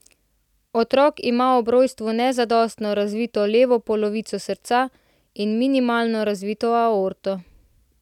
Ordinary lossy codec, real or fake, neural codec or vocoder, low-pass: none; real; none; 19.8 kHz